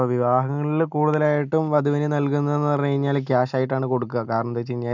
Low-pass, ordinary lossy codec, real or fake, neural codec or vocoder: 7.2 kHz; none; real; none